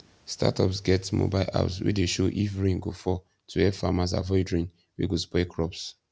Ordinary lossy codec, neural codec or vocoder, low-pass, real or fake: none; none; none; real